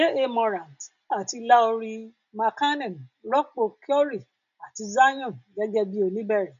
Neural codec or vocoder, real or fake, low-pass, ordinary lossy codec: none; real; 7.2 kHz; none